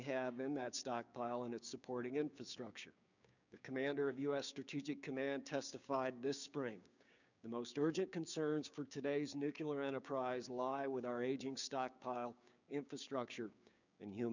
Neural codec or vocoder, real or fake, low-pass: codec, 16 kHz, 6 kbps, DAC; fake; 7.2 kHz